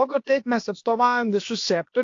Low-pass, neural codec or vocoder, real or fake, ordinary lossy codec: 7.2 kHz; codec, 16 kHz, about 1 kbps, DyCAST, with the encoder's durations; fake; AAC, 48 kbps